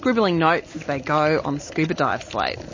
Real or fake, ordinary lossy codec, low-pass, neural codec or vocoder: real; MP3, 32 kbps; 7.2 kHz; none